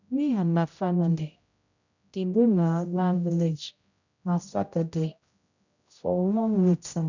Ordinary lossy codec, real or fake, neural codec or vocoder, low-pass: none; fake; codec, 16 kHz, 0.5 kbps, X-Codec, HuBERT features, trained on general audio; 7.2 kHz